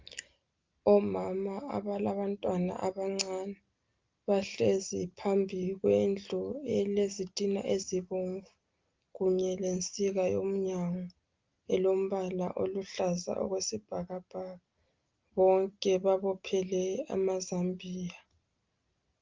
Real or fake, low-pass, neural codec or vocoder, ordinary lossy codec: real; 7.2 kHz; none; Opus, 24 kbps